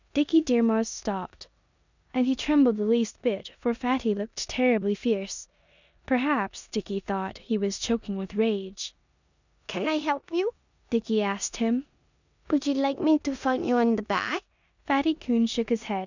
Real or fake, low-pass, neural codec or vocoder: fake; 7.2 kHz; codec, 16 kHz in and 24 kHz out, 0.9 kbps, LongCat-Audio-Codec, four codebook decoder